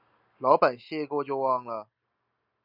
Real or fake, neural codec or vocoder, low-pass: real; none; 5.4 kHz